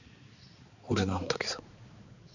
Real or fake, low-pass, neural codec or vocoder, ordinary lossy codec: fake; 7.2 kHz; codec, 16 kHz, 4 kbps, X-Codec, HuBERT features, trained on balanced general audio; none